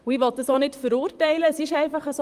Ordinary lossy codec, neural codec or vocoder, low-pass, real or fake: Opus, 32 kbps; vocoder, 44.1 kHz, 128 mel bands, Pupu-Vocoder; 14.4 kHz; fake